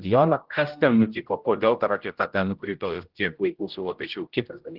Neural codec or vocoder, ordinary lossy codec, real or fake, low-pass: codec, 16 kHz, 0.5 kbps, X-Codec, HuBERT features, trained on general audio; Opus, 16 kbps; fake; 5.4 kHz